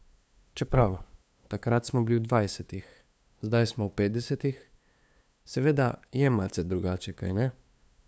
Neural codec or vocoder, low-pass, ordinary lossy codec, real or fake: codec, 16 kHz, 2 kbps, FunCodec, trained on LibriTTS, 25 frames a second; none; none; fake